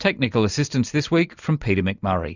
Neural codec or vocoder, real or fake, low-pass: none; real; 7.2 kHz